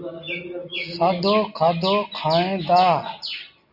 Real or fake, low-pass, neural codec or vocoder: real; 5.4 kHz; none